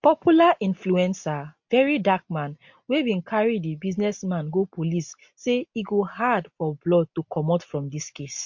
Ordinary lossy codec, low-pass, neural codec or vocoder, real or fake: MP3, 48 kbps; 7.2 kHz; none; real